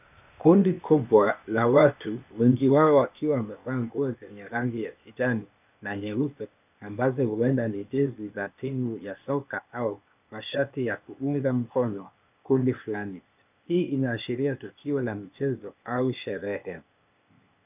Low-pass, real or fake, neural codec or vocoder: 3.6 kHz; fake; codec, 16 kHz, 0.8 kbps, ZipCodec